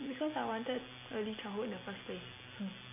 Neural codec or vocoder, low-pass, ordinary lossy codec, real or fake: none; 3.6 kHz; MP3, 32 kbps; real